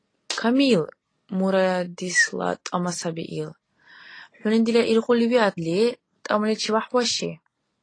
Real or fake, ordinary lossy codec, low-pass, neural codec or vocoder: real; AAC, 32 kbps; 9.9 kHz; none